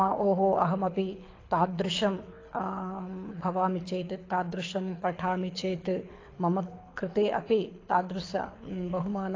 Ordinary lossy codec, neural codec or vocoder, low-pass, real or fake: AAC, 32 kbps; codec, 24 kHz, 6 kbps, HILCodec; 7.2 kHz; fake